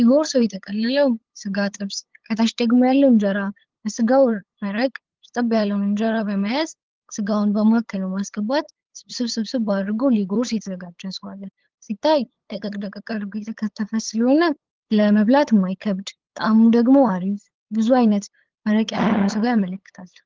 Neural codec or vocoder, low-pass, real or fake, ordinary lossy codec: codec, 16 kHz, 8 kbps, FunCodec, trained on LibriTTS, 25 frames a second; 7.2 kHz; fake; Opus, 16 kbps